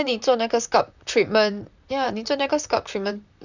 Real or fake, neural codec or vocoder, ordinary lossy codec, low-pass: fake; vocoder, 44.1 kHz, 128 mel bands, Pupu-Vocoder; none; 7.2 kHz